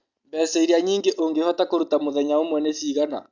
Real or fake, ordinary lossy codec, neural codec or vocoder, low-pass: real; Opus, 64 kbps; none; 7.2 kHz